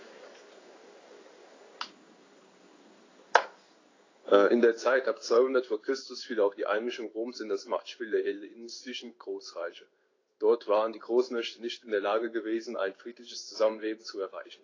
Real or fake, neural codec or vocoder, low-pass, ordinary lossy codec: fake; codec, 16 kHz in and 24 kHz out, 1 kbps, XY-Tokenizer; 7.2 kHz; AAC, 32 kbps